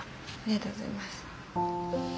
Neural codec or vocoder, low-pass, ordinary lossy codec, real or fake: none; none; none; real